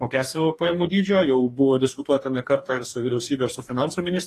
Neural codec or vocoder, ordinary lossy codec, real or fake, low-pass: codec, 44.1 kHz, 2.6 kbps, DAC; AAC, 48 kbps; fake; 14.4 kHz